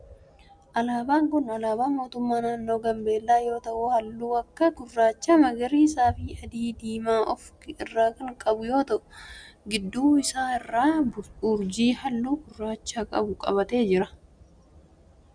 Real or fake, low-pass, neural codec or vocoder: real; 9.9 kHz; none